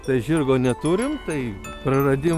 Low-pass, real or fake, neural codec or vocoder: 14.4 kHz; real; none